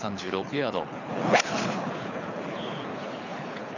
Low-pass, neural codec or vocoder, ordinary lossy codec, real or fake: 7.2 kHz; codec, 24 kHz, 6 kbps, HILCodec; none; fake